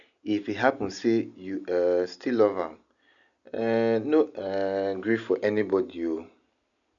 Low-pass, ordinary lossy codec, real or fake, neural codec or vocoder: 7.2 kHz; none; real; none